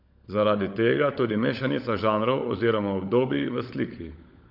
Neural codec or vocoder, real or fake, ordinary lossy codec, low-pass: codec, 16 kHz, 16 kbps, FunCodec, trained on LibriTTS, 50 frames a second; fake; none; 5.4 kHz